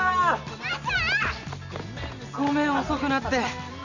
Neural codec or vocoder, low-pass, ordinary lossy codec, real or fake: none; 7.2 kHz; none; real